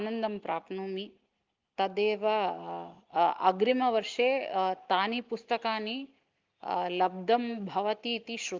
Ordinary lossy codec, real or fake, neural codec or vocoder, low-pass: Opus, 24 kbps; real; none; 7.2 kHz